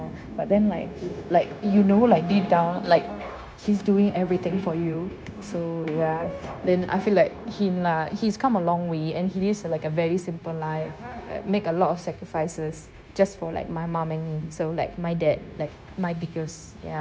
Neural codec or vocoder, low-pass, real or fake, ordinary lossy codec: codec, 16 kHz, 0.9 kbps, LongCat-Audio-Codec; none; fake; none